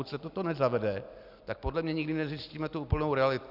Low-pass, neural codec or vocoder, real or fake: 5.4 kHz; none; real